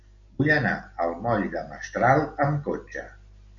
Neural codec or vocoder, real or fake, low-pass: none; real; 7.2 kHz